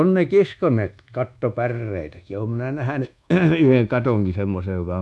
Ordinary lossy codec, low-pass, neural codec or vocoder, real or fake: none; none; codec, 24 kHz, 1.2 kbps, DualCodec; fake